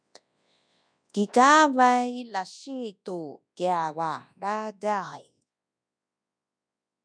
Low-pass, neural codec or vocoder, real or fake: 9.9 kHz; codec, 24 kHz, 0.9 kbps, WavTokenizer, large speech release; fake